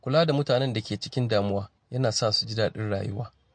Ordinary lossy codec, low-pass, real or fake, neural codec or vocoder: MP3, 48 kbps; 9.9 kHz; real; none